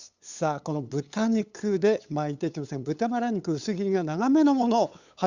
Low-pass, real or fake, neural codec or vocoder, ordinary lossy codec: 7.2 kHz; fake; codec, 16 kHz, 8 kbps, FunCodec, trained on LibriTTS, 25 frames a second; Opus, 64 kbps